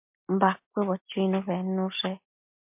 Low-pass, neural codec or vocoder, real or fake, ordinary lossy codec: 3.6 kHz; none; real; MP3, 32 kbps